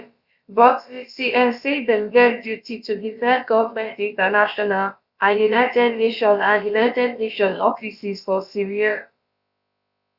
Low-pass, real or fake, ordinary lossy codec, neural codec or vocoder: 5.4 kHz; fake; Opus, 64 kbps; codec, 16 kHz, about 1 kbps, DyCAST, with the encoder's durations